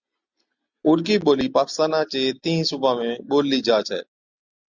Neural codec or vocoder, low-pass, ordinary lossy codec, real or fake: none; 7.2 kHz; Opus, 64 kbps; real